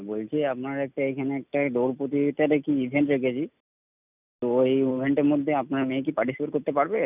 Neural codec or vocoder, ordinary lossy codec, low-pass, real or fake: none; none; 3.6 kHz; real